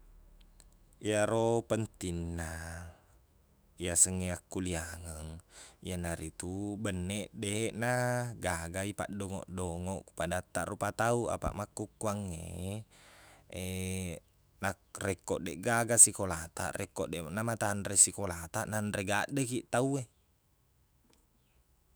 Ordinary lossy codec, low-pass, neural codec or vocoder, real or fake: none; none; autoencoder, 48 kHz, 128 numbers a frame, DAC-VAE, trained on Japanese speech; fake